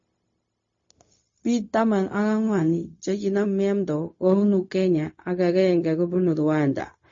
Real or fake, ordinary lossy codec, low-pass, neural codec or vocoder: fake; MP3, 32 kbps; 7.2 kHz; codec, 16 kHz, 0.4 kbps, LongCat-Audio-Codec